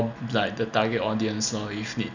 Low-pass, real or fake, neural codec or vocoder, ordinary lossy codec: 7.2 kHz; real; none; none